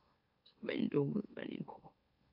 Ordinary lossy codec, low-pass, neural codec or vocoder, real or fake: AAC, 48 kbps; 5.4 kHz; autoencoder, 44.1 kHz, a latent of 192 numbers a frame, MeloTTS; fake